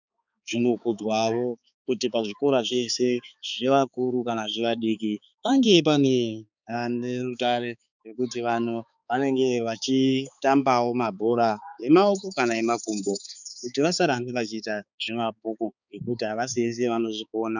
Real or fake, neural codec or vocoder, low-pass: fake; codec, 16 kHz, 4 kbps, X-Codec, HuBERT features, trained on balanced general audio; 7.2 kHz